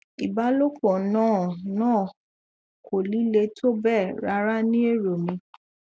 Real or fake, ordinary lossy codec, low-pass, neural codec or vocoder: real; none; none; none